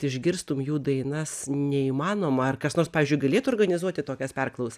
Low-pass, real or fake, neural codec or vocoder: 14.4 kHz; real; none